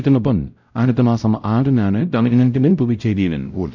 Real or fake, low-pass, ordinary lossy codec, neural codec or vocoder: fake; 7.2 kHz; none; codec, 16 kHz, 0.5 kbps, X-Codec, WavLM features, trained on Multilingual LibriSpeech